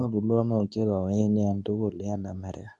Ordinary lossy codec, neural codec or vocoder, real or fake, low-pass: none; codec, 24 kHz, 0.9 kbps, WavTokenizer, medium speech release version 2; fake; 10.8 kHz